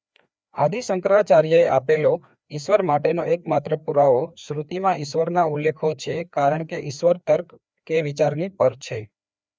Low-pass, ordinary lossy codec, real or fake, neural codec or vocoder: none; none; fake; codec, 16 kHz, 2 kbps, FreqCodec, larger model